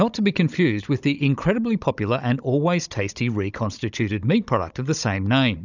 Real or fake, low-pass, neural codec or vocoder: fake; 7.2 kHz; codec, 16 kHz, 16 kbps, FunCodec, trained on Chinese and English, 50 frames a second